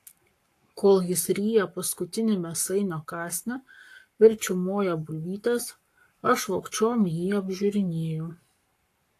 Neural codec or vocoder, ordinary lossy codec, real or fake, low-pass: codec, 44.1 kHz, 7.8 kbps, Pupu-Codec; AAC, 64 kbps; fake; 14.4 kHz